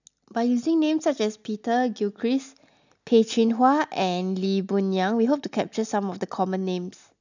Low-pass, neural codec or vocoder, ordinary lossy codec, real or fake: 7.2 kHz; none; none; real